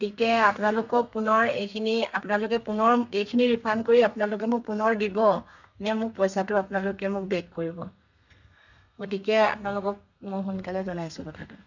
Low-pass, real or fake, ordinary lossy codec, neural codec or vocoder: 7.2 kHz; fake; none; codec, 32 kHz, 1.9 kbps, SNAC